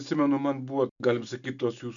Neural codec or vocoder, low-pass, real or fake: none; 7.2 kHz; real